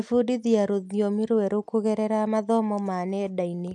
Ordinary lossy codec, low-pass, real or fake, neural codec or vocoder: none; none; real; none